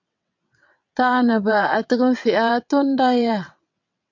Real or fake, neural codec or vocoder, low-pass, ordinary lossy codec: fake; vocoder, 22.05 kHz, 80 mel bands, WaveNeXt; 7.2 kHz; MP3, 64 kbps